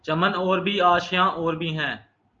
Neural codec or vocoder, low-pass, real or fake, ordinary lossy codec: none; 7.2 kHz; real; Opus, 24 kbps